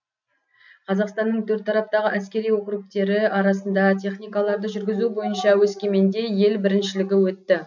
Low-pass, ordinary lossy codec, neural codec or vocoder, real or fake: 7.2 kHz; none; none; real